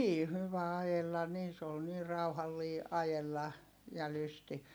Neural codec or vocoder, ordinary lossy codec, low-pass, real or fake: none; none; none; real